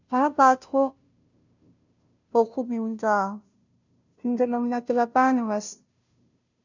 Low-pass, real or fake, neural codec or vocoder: 7.2 kHz; fake; codec, 16 kHz, 0.5 kbps, FunCodec, trained on Chinese and English, 25 frames a second